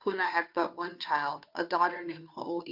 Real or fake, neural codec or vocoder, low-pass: fake; codec, 16 kHz, 2 kbps, FunCodec, trained on Chinese and English, 25 frames a second; 5.4 kHz